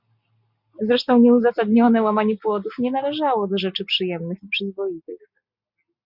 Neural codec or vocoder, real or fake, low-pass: none; real; 5.4 kHz